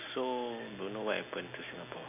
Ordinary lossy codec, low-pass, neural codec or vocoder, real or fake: none; 3.6 kHz; none; real